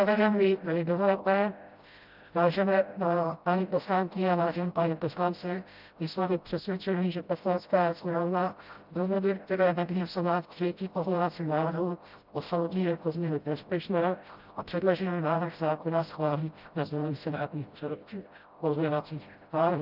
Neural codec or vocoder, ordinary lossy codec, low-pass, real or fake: codec, 16 kHz, 0.5 kbps, FreqCodec, smaller model; Opus, 24 kbps; 5.4 kHz; fake